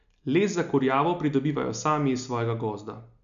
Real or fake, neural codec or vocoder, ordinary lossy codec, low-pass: real; none; none; 7.2 kHz